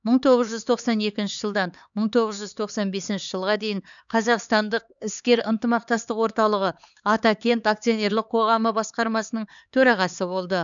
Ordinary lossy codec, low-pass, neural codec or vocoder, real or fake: none; 7.2 kHz; codec, 16 kHz, 4 kbps, X-Codec, HuBERT features, trained on LibriSpeech; fake